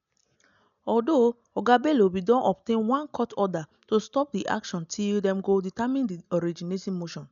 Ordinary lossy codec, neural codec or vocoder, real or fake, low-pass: none; none; real; 7.2 kHz